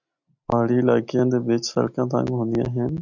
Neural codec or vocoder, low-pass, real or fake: none; 7.2 kHz; real